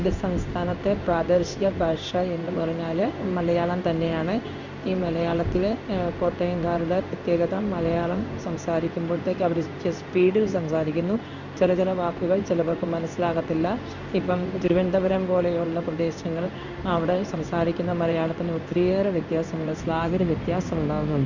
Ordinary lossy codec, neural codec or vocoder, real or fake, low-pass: none; codec, 16 kHz in and 24 kHz out, 1 kbps, XY-Tokenizer; fake; 7.2 kHz